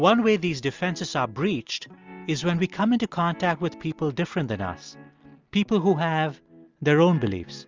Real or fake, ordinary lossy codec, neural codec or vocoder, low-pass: real; Opus, 32 kbps; none; 7.2 kHz